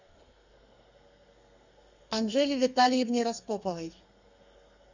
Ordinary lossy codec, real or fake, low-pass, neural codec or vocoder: Opus, 64 kbps; fake; 7.2 kHz; codec, 32 kHz, 1.9 kbps, SNAC